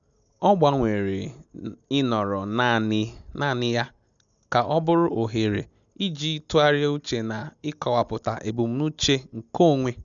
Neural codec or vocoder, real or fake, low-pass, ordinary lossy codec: none; real; 7.2 kHz; none